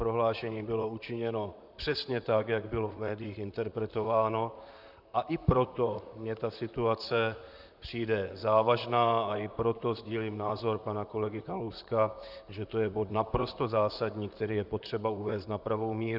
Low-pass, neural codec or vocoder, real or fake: 5.4 kHz; vocoder, 44.1 kHz, 128 mel bands, Pupu-Vocoder; fake